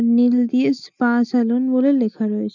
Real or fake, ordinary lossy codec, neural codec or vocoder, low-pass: real; none; none; 7.2 kHz